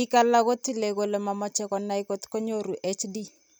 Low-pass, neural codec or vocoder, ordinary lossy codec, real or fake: none; none; none; real